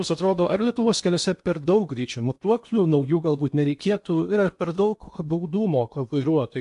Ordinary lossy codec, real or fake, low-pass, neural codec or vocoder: MP3, 64 kbps; fake; 10.8 kHz; codec, 16 kHz in and 24 kHz out, 0.8 kbps, FocalCodec, streaming, 65536 codes